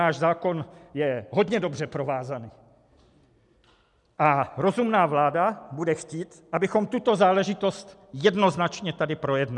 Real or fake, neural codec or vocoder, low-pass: real; none; 10.8 kHz